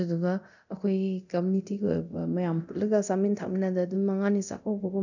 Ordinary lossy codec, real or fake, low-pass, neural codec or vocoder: none; fake; 7.2 kHz; codec, 24 kHz, 0.9 kbps, DualCodec